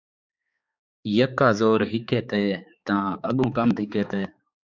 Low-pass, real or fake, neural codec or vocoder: 7.2 kHz; fake; codec, 16 kHz, 4 kbps, X-Codec, HuBERT features, trained on balanced general audio